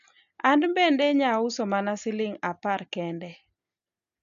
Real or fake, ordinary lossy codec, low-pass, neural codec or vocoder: real; none; 7.2 kHz; none